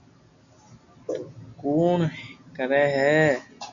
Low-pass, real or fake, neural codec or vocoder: 7.2 kHz; real; none